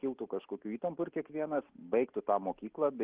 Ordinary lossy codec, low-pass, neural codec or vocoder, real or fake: Opus, 16 kbps; 3.6 kHz; none; real